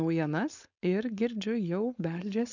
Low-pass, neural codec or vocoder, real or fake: 7.2 kHz; codec, 16 kHz, 4.8 kbps, FACodec; fake